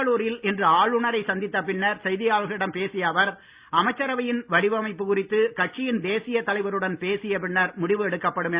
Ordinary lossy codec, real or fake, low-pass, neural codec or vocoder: Opus, 64 kbps; real; 3.6 kHz; none